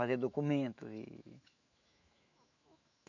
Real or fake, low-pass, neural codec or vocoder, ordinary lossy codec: fake; 7.2 kHz; autoencoder, 48 kHz, 128 numbers a frame, DAC-VAE, trained on Japanese speech; none